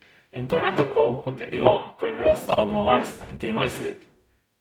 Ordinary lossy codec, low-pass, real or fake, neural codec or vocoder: none; 19.8 kHz; fake; codec, 44.1 kHz, 0.9 kbps, DAC